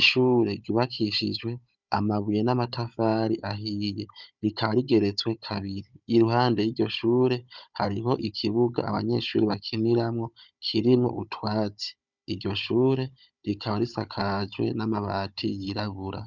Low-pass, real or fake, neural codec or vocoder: 7.2 kHz; fake; codec, 16 kHz, 16 kbps, FunCodec, trained on Chinese and English, 50 frames a second